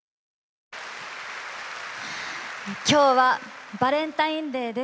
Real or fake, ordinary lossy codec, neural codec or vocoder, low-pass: real; none; none; none